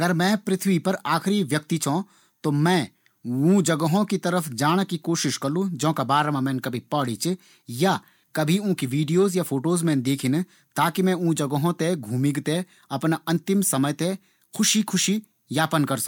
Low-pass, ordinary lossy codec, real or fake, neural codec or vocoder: 19.8 kHz; MP3, 96 kbps; real; none